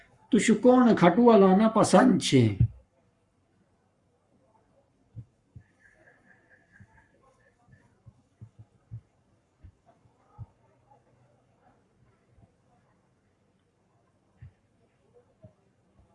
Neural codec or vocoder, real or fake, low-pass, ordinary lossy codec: codec, 44.1 kHz, 7.8 kbps, Pupu-Codec; fake; 10.8 kHz; Opus, 64 kbps